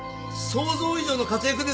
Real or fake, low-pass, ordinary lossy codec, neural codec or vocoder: real; none; none; none